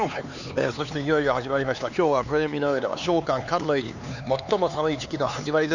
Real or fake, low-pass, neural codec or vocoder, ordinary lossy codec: fake; 7.2 kHz; codec, 16 kHz, 4 kbps, X-Codec, HuBERT features, trained on LibriSpeech; none